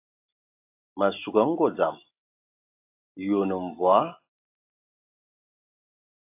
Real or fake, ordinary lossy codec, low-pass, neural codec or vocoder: real; AAC, 24 kbps; 3.6 kHz; none